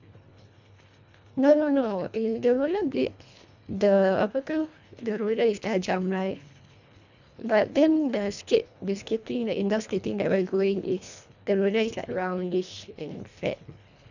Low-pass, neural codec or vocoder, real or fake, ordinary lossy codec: 7.2 kHz; codec, 24 kHz, 1.5 kbps, HILCodec; fake; none